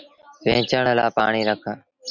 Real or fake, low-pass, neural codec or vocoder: real; 7.2 kHz; none